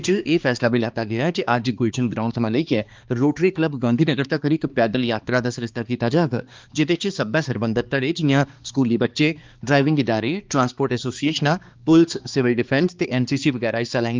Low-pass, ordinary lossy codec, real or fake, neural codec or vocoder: 7.2 kHz; Opus, 24 kbps; fake; codec, 16 kHz, 2 kbps, X-Codec, HuBERT features, trained on balanced general audio